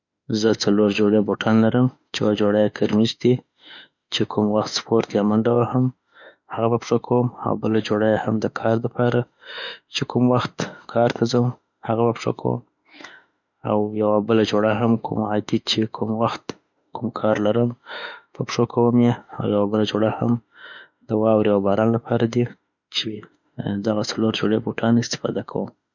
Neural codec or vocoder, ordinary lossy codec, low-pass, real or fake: autoencoder, 48 kHz, 32 numbers a frame, DAC-VAE, trained on Japanese speech; none; 7.2 kHz; fake